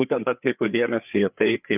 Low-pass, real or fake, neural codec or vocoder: 3.6 kHz; fake; codec, 16 kHz, 4 kbps, FreqCodec, larger model